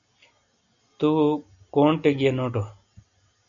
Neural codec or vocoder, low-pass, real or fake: none; 7.2 kHz; real